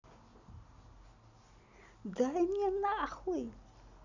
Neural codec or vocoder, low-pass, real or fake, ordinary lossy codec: none; 7.2 kHz; real; none